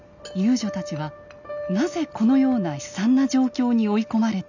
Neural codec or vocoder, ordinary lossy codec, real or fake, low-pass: none; none; real; 7.2 kHz